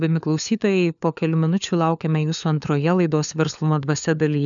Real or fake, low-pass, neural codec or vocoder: fake; 7.2 kHz; codec, 16 kHz, 4 kbps, FunCodec, trained on LibriTTS, 50 frames a second